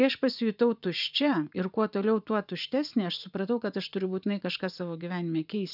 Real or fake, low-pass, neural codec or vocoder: fake; 5.4 kHz; vocoder, 44.1 kHz, 80 mel bands, Vocos